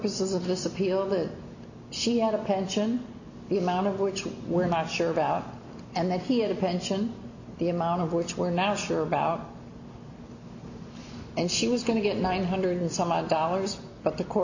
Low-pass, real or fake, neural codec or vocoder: 7.2 kHz; real; none